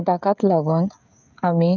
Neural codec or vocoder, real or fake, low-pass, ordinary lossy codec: codec, 16 kHz, 16 kbps, FreqCodec, smaller model; fake; 7.2 kHz; none